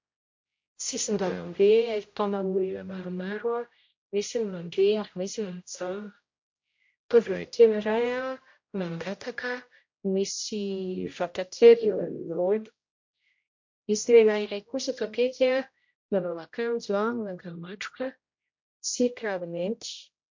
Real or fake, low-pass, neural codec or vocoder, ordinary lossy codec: fake; 7.2 kHz; codec, 16 kHz, 0.5 kbps, X-Codec, HuBERT features, trained on general audio; MP3, 48 kbps